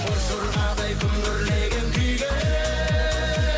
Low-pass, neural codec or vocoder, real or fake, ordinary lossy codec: none; none; real; none